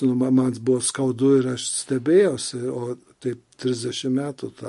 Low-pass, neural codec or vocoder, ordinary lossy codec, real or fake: 14.4 kHz; none; MP3, 48 kbps; real